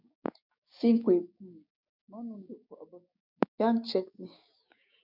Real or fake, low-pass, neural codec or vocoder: fake; 5.4 kHz; codec, 16 kHz, 6 kbps, DAC